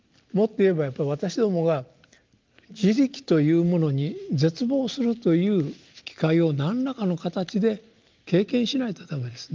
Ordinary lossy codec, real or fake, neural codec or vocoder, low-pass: Opus, 32 kbps; real; none; 7.2 kHz